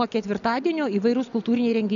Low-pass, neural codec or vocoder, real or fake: 7.2 kHz; none; real